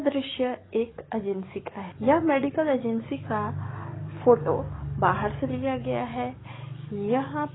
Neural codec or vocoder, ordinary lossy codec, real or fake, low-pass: codec, 44.1 kHz, 7.8 kbps, DAC; AAC, 16 kbps; fake; 7.2 kHz